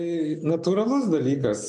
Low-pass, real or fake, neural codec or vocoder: 10.8 kHz; real; none